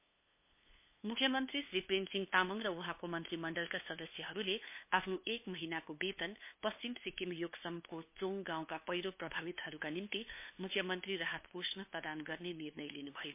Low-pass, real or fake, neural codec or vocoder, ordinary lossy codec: 3.6 kHz; fake; codec, 16 kHz, 2 kbps, FunCodec, trained on LibriTTS, 25 frames a second; MP3, 24 kbps